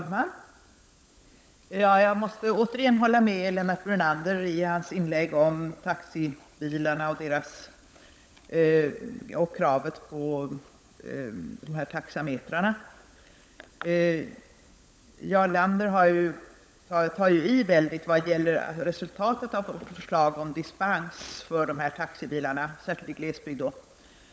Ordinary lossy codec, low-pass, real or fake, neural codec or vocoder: none; none; fake; codec, 16 kHz, 16 kbps, FunCodec, trained on LibriTTS, 50 frames a second